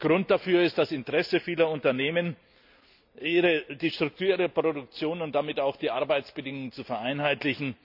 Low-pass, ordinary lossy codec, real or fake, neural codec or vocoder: 5.4 kHz; none; real; none